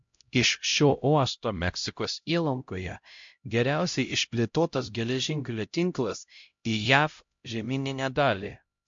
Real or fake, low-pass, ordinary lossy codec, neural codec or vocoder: fake; 7.2 kHz; MP3, 48 kbps; codec, 16 kHz, 0.5 kbps, X-Codec, HuBERT features, trained on LibriSpeech